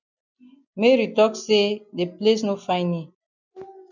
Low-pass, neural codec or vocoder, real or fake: 7.2 kHz; none; real